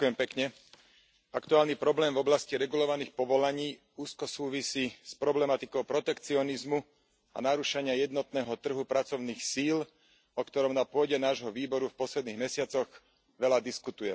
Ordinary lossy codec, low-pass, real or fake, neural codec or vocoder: none; none; real; none